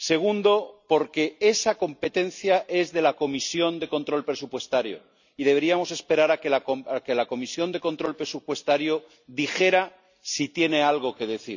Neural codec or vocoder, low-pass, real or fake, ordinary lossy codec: none; 7.2 kHz; real; none